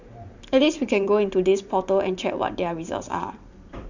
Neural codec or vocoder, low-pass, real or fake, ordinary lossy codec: vocoder, 44.1 kHz, 128 mel bands every 512 samples, BigVGAN v2; 7.2 kHz; fake; none